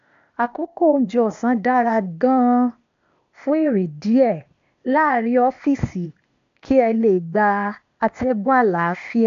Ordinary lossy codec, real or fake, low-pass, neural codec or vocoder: AAC, 64 kbps; fake; 7.2 kHz; codec, 16 kHz, 0.8 kbps, ZipCodec